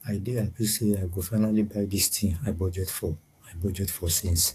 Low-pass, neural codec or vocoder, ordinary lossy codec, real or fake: 14.4 kHz; codec, 44.1 kHz, 7.8 kbps, DAC; AAC, 64 kbps; fake